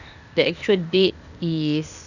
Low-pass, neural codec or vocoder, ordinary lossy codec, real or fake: 7.2 kHz; codec, 16 kHz, 0.8 kbps, ZipCodec; none; fake